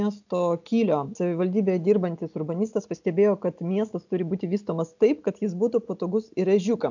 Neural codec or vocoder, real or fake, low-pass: none; real; 7.2 kHz